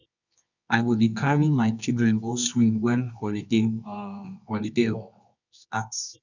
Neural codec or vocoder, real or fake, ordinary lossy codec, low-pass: codec, 24 kHz, 0.9 kbps, WavTokenizer, medium music audio release; fake; none; 7.2 kHz